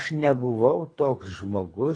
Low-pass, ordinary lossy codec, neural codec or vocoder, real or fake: 9.9 kHz; AAC, 32 kbps; codec, 24 kHz, 3 kbps, HILCodec; fake